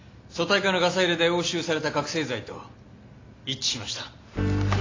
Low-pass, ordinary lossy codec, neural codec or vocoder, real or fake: 7.2 kHz; AAC, 32 kbps; none; real